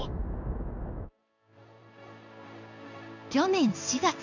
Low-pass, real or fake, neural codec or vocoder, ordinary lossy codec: 7.2 kHz; fake; codec, 16 kHz in and 24 kHz out, 1 kbps, XY-Tokenizer; none